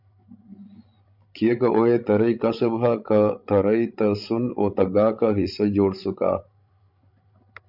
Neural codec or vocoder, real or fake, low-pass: codec, 16 kHz, 16 kbps, FreqCodec, larger model; fake; 5.4 kHz